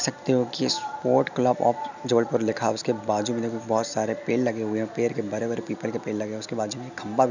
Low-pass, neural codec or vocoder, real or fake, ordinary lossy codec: 7.2 kHz; none; real; none